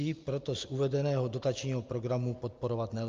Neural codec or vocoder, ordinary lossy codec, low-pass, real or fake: none; Opus, 24 kbps; 7.2 kHz; real